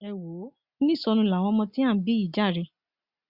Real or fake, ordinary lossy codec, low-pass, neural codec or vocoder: real; Opus, 64 kbps; 5.4 kHz; none